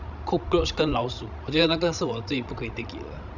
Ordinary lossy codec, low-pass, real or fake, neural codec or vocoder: none; 7.2 kHz; fake; codec, 16 kHz, 16 kbps, FreqCodec, larger model